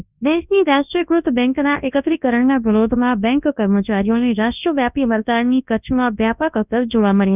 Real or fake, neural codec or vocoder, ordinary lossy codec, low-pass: fake; codec, 24 kHz, 0.9 kbps, WavTokenizer, large speech release; none; 3.6 kHz